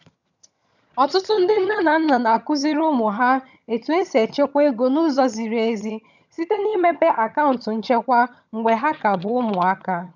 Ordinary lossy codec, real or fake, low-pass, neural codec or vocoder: none; fake; 7.2 kHz; vocoder, 22.05 kHz, 80 mel bands, HiFi-GAN